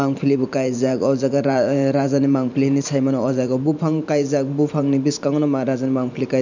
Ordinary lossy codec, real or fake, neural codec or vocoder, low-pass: none; fake; vocoder, 44.1 kHz, 128 mel bands every 512 samples, BigVGAN v2; 7.2 kHz